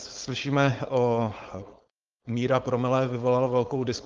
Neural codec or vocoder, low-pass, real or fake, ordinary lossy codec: codec, 16 kHz, 4.8 kbps, FACodec; 7.2 kHz; fake; Opus, 32 kbps